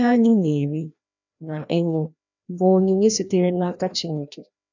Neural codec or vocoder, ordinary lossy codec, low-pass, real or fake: codec, 16 kHz, 1 kbps, FreqCodec, larger model; none; 7.2 kHz; fake